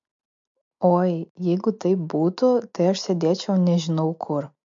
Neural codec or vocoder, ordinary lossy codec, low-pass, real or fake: none; MP3, 48 kbps; 7.2 kHz; real